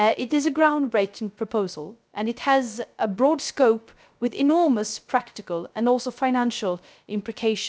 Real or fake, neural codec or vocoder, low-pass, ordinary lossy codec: fake; codec, 16 kHz, 0.3 kbps, FocalCodec; none; none